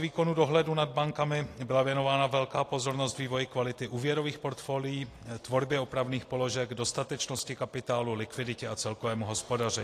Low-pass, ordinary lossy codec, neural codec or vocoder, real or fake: 14.4 kHz; AAC, 48 kbps; none; real